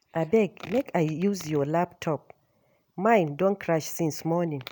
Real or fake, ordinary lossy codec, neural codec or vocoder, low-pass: real; none; none; none